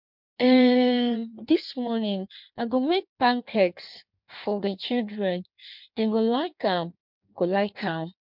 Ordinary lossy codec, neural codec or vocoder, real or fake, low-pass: MP3, 48 kbps; codec, 16 kHz in and 24 kHz out, 1.1 kbps, FireRedTTS-2 codec; fake; 5.4 kHz